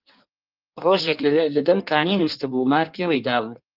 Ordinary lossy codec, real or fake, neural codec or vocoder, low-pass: Opus, 32 kbps; fake; codec, 16 kHz in and 24 kHz out, 1.1 kbps, FireRedTTS-2 codec; 5.4 kHz